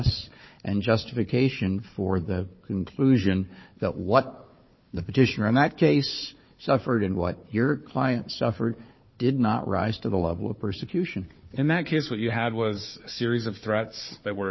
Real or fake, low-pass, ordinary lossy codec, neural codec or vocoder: fake; 7.2 kHz; MP3, 24 kbps; codec, 16 kHz, 4 kbps, FunCodec, trained on Chinese and English, 50 frames a second